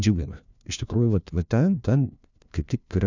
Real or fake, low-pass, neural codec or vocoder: fake; 7.2 kHz; codec, 16 kHz, 1 kbps, FunCodec, trained on LibriTTS, 50 frames a second